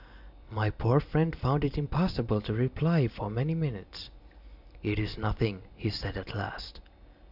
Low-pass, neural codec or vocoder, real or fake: 5.4 kHz; none; real